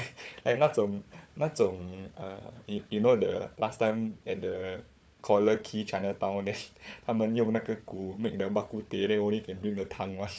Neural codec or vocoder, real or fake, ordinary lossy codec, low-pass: codec, 16 kHz, 4 kbps, FunCodec, trained on Chinese and English, 50 frames a second; fake; none; none